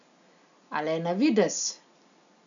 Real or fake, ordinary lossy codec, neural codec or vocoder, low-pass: real; none; none; 7.2 kHz